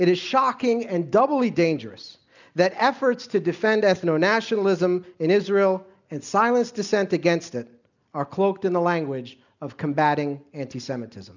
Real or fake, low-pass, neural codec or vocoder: real; 7.2 kHz; none